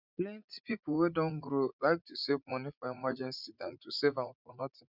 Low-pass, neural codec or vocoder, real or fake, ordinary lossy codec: 5.4 kHz; vocoder, 44.1 kHz, 80 mel bands, Vocos; fake; none